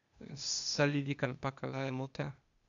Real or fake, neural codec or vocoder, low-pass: fake; codec, 16 kHz, 0.8 kbps, ZipCodec; 7.2 kHz